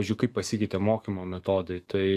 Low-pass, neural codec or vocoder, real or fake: 14.4 kHz; codec, 44.1 kHz, 7.8 kbps, DAC; fake